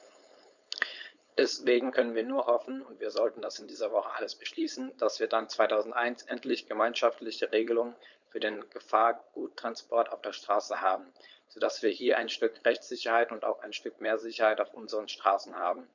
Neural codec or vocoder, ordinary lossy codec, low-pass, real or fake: codec, 16 kHz, 4.8 kbps, FACodec; none; 7.2 kHz; fake